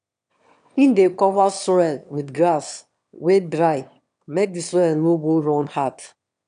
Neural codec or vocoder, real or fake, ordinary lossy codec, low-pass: autoencoder, 22.05 kHz, a latent of 192 numbers a frame, VITS, trained on one speaker; fake; none; 9.9 kHz